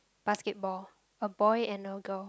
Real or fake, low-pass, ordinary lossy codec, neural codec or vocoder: real; none; none; none